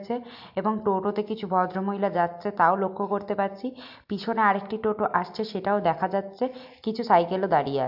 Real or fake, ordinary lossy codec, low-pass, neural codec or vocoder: real; AAC, 48 kbps; 5.4 kHz; none